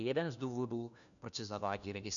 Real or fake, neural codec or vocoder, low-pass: fake; codec, 16 kHz, 1 kbps, FunCodec, trained on LibriTTS, 50 frames a second; 7.2 kHz